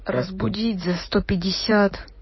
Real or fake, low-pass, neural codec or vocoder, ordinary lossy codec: fake; 7.2 kHz; codec, 16 kHz in and 24 kHz out, 2.2 kbps, FireRedTTS-2 codec; MP3, 24 kbps